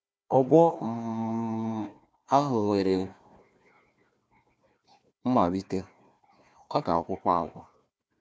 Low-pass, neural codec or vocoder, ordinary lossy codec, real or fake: none; codec, 16 kHz, 1 kbps, FunCodec, trained on Chinese and English, 50 frames a second; none; fake